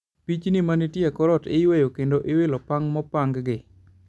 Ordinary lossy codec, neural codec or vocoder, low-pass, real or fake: none; none; none; real